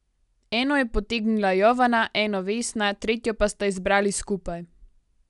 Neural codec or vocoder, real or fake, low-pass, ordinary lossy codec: none; real; 10.8 kHz; none